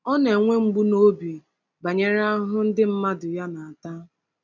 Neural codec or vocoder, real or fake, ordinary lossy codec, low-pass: none; real; none; 7.2 kHz